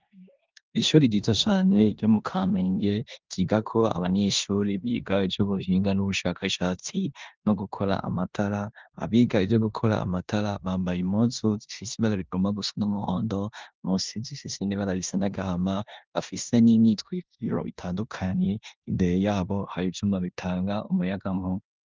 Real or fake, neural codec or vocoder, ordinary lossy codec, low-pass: fake; codec, 16 kHz in and 24 kHz out, 0.9 kbps, LongCat-Audio-Codec, four codebook decoder; Opus, 32 kbps; 7.2 kHz